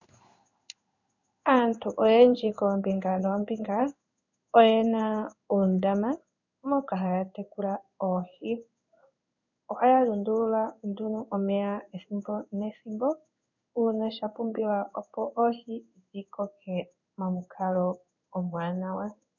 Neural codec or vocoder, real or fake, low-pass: codec, 16 kHz in and 24 kHz out, 1 kbps, XY-Tokenizer; fake; 7.2 kHz